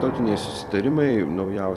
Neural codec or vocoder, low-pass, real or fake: vocoder, 44.1 kHz, 128 mel bands every 256 samples, BigVGAN v2; 14.4 kHz; fake